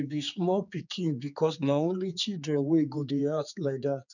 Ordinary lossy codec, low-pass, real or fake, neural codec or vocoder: none; 7.2 kHz; fake; codec, 16 kHz, 4 kbps, X-Codec, HuBERT features, trained on general audio